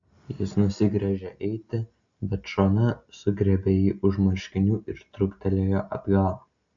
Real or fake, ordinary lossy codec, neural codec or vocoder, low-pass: real; AAC, 64 kbps; none; 7.2 kHz